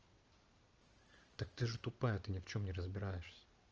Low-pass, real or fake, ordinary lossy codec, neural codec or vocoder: 7.2 kHz; real; Opus, 24 kbps; none